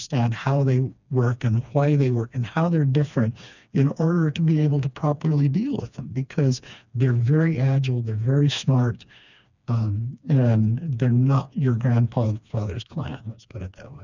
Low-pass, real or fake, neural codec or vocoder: 7.2 kHz; fake; codec, 16 kHz, 2 kbps, FreqCodec, smaller model